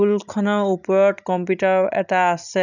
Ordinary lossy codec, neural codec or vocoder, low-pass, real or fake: none; none; 7.2 kHz; real